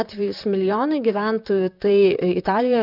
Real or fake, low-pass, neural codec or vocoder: fake; 5.4 kHz; vocoder, 44.1 kHz, 128 mel bands, Pupu-Vocoder